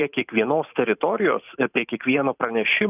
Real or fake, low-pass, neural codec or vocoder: fake; 3.6 kHz; vocoder, 44.1 kHz, 128 mel bands every 512 samples, BigVGAN v2